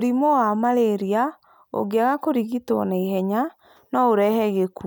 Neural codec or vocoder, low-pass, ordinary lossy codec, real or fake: none; none; none; real